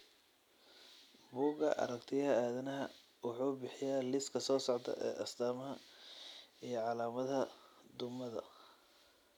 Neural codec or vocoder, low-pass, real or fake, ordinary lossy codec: none; 19.8 kHz; real; none